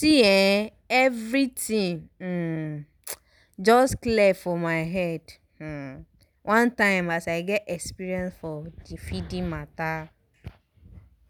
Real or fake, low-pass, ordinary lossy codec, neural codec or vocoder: real; none; none; none